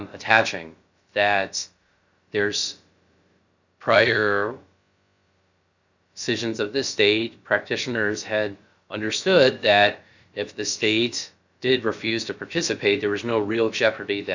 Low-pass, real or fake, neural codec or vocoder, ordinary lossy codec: 7.2 kHz; fake; codec, 16 kHz, about 1 kbps, DyCAST, with the encoder's durations; Opus, 64 kbps